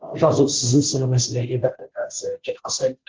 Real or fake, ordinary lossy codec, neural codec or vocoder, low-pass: fake; Opus, 16 kbps; codec, 16 kHz, 0.5 kbps, FunCodec, trained on Chinese and English, 25 frames a second; 7.2 kHz